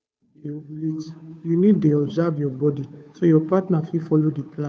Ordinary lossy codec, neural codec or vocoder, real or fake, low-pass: none; codec, 16 kHz, 2 kbps, FunCodec, trained on Chinese and English, 25 frames a second; fake; none